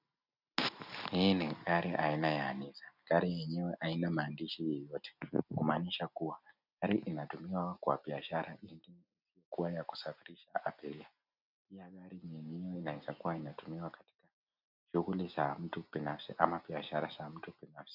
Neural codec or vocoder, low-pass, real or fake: none; 5.4 kHz; real